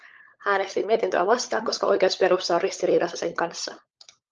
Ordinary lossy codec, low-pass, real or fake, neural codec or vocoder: Opus, 24 kbps; 7.2 kHz; fake; codec, 16 kHz, 16 kbps, FunCodec, trained on LibriTTS, 50 frames a second